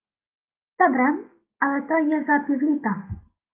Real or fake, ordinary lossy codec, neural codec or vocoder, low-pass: real; Opus, 24 kbps; none; 3.6 kHz